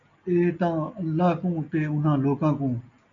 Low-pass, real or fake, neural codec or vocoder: 7.2 kHz; real; none